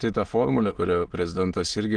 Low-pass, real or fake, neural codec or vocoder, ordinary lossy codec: 9.9 kHz; fake; autoencoder, 22.05 kHz, a latent of 192 numbers a frame, VITS, trained on many speakers; Opus, 16 kbps